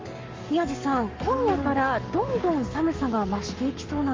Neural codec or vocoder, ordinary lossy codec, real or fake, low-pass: codec, 44.1 kHz, 7.8 kbps, Pupu-Codec; Opus, 32 kbps; fake; 7.2 kHz